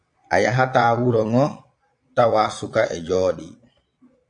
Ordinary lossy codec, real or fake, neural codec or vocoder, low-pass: AAC, 64 kbps; fake; vocoder, 22.05 kHz, 80 mel bands, Vocos; 9.9 kHz